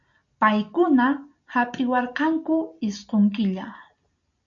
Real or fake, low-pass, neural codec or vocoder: real; 7.2 kHz; none